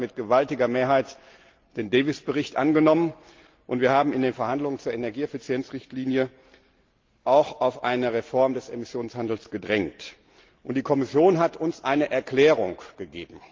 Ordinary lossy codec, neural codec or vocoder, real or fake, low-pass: Opus, 32 kbps; none; real; 7.2 kHz